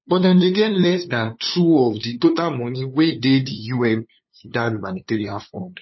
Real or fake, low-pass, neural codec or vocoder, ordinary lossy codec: fake; 7.2 kHz; codec, 16 kHz, 2 kbps, FunCodec, trained on LibriTTS, 25 frames a second; MP3, 24 kbps